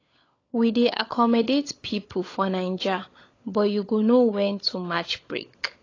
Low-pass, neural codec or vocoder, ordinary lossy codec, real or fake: 7.2 kHz; codec, 16 kHz, 16 kbps, FunCodec, trained on LibriTTS, 50 frames a second; AAC, 32 kbps; fake